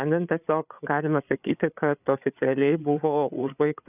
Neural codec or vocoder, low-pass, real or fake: codec, 16 kHz, 8 kbps, FreqCodec, larger model; 3.6 kHz; fake